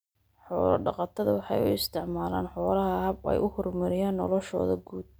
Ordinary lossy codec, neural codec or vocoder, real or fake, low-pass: none; none; real; none